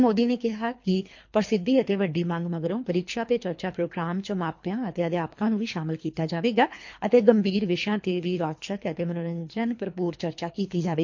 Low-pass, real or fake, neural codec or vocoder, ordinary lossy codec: 7.2 kHz; fake; codec, 24 kHz, 3 kbps, HILCodec; MP3, 48 kbps